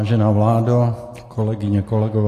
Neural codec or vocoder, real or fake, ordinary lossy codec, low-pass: none; real; AAC, 48 kbps; 14.4 kHz